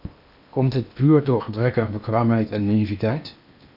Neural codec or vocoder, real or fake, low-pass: codec, 16 kHz in and 24 kHz out, 0.8 kbps, FocalCodec, streaming, 65536 codes; fake; 5.4 kHz